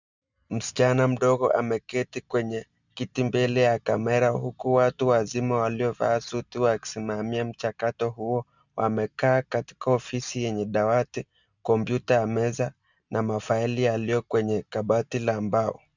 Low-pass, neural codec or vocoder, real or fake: 7.2 kHz; none; real